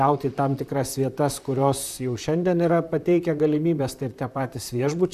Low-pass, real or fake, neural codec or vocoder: 14.4 kHz; fake; vocoder, 44.1 kHz, 128 mel bands, Pupu-Vocoder